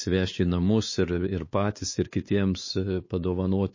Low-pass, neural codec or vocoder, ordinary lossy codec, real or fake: 7.2 kHz; codec, 16 kHz, 4 kbps, X-Codec, WavLM features, trained on Multilingual LibriSpeech; MP3, 32 kbps; fake